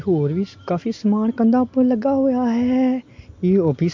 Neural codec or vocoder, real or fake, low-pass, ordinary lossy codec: none; real; 7.2 kHz; MP3, 48 kbps